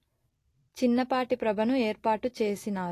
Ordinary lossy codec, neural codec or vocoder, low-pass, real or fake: AAC, 48 kbps; none; 14.4 kHz; real